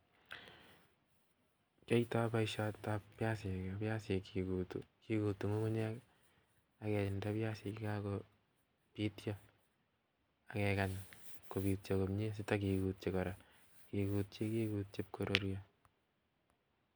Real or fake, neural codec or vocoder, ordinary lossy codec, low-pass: real; none; none; none